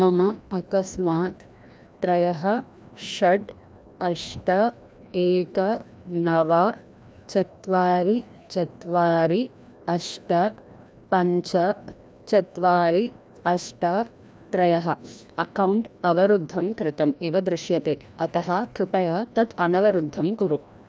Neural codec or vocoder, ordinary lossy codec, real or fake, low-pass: codec, 16 kHz, 1 kbps, FreqCodec, larger model; none; fake; none